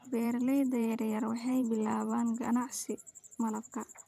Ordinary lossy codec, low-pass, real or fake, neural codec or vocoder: none; 14.4 kHz; fake; vocoder, 44.1 kHz, 128 mel bands every 512 samples, BigVGAN v2